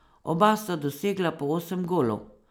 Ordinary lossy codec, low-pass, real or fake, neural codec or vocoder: none; none; real; none